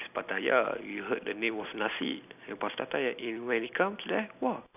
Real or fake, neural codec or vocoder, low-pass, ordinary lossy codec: real; none; 3.6 kHz; none